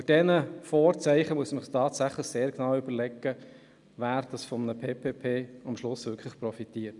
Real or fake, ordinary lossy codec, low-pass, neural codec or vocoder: real; none; 10.8 kHz; none